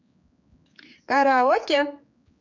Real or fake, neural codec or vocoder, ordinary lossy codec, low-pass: fake; codec, 16 kHz, 4 kbps, X-Codec, HuBERT features, trained on general audio; MP3, 64 kbps; 7.2 kHz